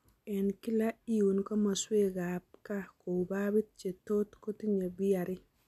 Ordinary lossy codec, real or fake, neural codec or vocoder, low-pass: MP3, 96 kbps; real; none; 14.4 kHz